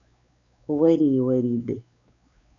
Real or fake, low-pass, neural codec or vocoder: fake; 7.2 kHz; codec, 16 kHz, 4 kbps, X-Codec, WavLM features, trained on Multilingual LibriSpeech